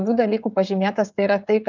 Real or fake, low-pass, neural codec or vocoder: fake; 7.2 kHz; codec, 16 kHz, 16 kbps, FreqCodec, smaller model